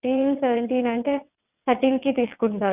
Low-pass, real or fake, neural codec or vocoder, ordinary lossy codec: 3.6 kHz; fake; vocoder, 22.05 kHz, 80 mel bands, WaveNeXt; none